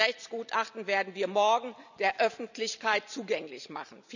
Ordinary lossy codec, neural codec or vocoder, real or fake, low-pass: none; vocoder, 44.1 kHz, 128 mel bands every 256 samples, BigVGAN v2; fake; 7.2 kHz